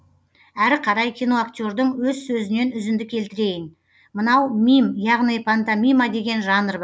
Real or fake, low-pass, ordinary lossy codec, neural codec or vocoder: real; none; none; none